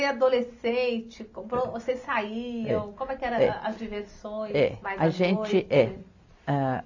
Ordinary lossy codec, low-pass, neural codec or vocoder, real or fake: AAC, 48 kbps; 7.2 kHz; none; real